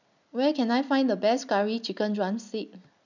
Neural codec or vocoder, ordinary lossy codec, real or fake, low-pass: none; none; real; 7.2 kHz